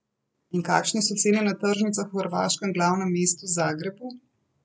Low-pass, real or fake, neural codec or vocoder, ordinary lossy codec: none; real; none; none